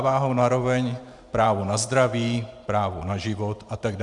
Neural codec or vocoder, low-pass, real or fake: vocoder, 44.1 kHz, 128 mel bands every 512 samples, BigVGAN v2; 10.8 kHz; fake